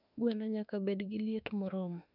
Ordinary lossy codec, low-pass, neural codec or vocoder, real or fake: none; 5.4 kHz; autoencoder, 48 kHz, 32 numbers a frame, DAC-VAE, trained on Japanese speech; fake